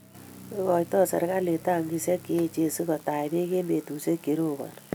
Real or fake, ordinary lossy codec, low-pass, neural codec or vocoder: real; none; none; none